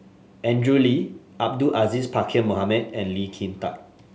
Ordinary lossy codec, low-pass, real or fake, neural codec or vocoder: none; none; real; none